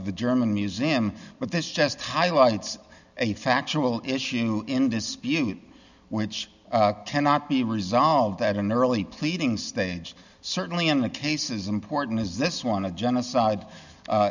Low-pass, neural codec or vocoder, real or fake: 7.2 kHz; none; real